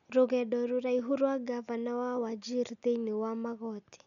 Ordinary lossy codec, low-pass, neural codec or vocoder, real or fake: none; 7.2 kHz; none; real